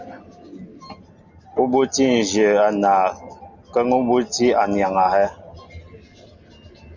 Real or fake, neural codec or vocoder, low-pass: real; none; 7.2 kHz